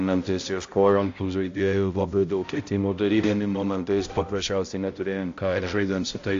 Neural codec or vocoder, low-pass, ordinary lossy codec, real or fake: codec, 16 kHz, 0.5 kbps, X-Codec, HuBERT features, trained on balanced general audio; 7.2 kHz; AAC, 48 kbps; fake